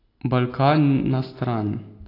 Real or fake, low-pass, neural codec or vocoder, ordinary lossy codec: real; 5.4 kHz; none; AAC, 32 kbps